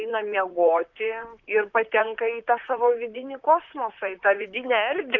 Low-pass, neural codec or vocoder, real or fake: 7.2 kHz; vocoder, 44.1 kHz, 128 mel bands, Pupu-Vocoder; fake